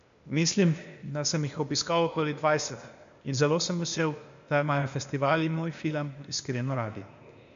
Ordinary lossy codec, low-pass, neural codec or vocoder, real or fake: MP3, 64 kbps; 7.2 kHz; codec, 16 kHz, 0.8 kbps, ZipCodec; fake